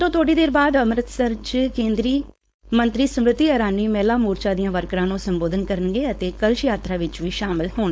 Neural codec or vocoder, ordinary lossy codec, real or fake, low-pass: codec, 16 kHz, 4.8 kbps, FACodec; none; fake; none